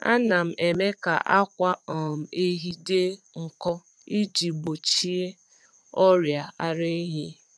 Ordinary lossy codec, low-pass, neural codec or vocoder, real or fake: none; none; vocoder, 22.05 kHz, 80 mel bands, Vocos; fake